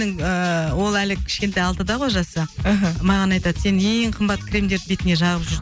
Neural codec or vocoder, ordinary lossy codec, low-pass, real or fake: none; none; none; real